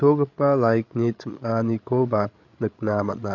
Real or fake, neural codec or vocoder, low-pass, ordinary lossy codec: fake; codec, 16 kHz, 8 kbps, FreqCodec, larger model; 7.2 kHz; none